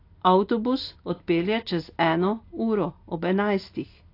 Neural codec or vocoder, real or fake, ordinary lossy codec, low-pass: none; real; AAC, 32 kbps; 5.4 kHz